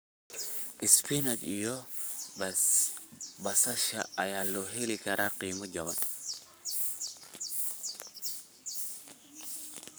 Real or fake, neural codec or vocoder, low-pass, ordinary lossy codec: fake; codec, 44.1 kHz, 7.8 kbps, Pupu-Codec; none; none